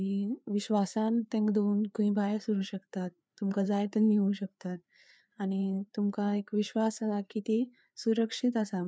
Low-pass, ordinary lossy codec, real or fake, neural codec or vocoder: none; none; fake; codec, 16 kHz, 4 kbps, FreqCodec, larger model